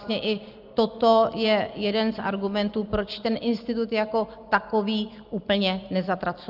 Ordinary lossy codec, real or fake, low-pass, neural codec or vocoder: Opus, 24 kbps; real; 5.4 kHz; none